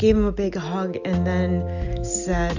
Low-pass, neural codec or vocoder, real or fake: 7.2 kHz; none; real